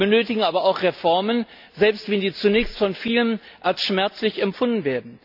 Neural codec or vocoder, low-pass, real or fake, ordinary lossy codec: none; 5.4 kHz; real; AAC, 48 kbps